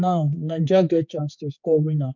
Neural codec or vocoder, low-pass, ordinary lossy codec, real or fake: codec, 16 kHz, 4 kbps, X-Codec, HuBERT features, trained on general audio; 7.2 kHz; none; fake